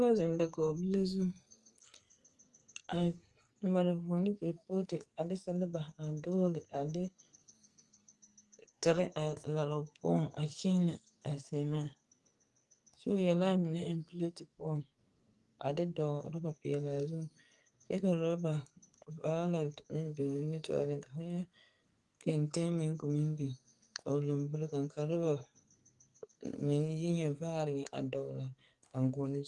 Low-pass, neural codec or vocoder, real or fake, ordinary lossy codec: 10.8 kHz; codec, 44.1 kHz, 2.6 kbps, SNAC; fake; Opus, 32 kbps